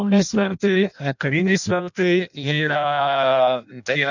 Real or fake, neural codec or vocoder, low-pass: fake; codec, 16 kHz in and 24 kHz out, 0.6 kbps, FireRedTTS-2 codec; 7.2 kHz